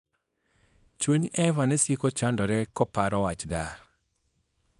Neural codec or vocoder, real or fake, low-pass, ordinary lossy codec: codec, 24 kHz, 0.9 kbps, WavTokenizer, small release; fake; 10.8 kHz; none